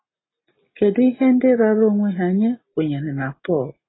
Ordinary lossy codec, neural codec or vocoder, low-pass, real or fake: AAC, 16 kbps; none; 7.2 kHz; real